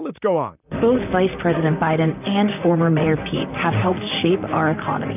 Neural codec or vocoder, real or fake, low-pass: vocoder, 44.1 kHz, 128 mel bands, Pupu-Vocoder; fake; 3.6 kHz